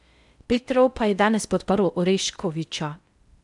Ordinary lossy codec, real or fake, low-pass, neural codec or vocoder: none; fake; 10.8 kHz; codec, 16 kHz in and 24 kHz out, 0.6 kbps, FocalCodec, streaming, 4096 codes